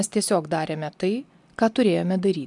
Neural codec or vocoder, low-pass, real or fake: none; 10.8 kHz; real